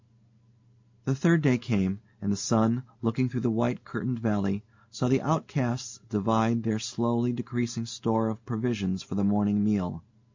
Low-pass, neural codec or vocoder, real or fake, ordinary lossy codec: 7.2 kHz; none; real; MP3, 48 kbps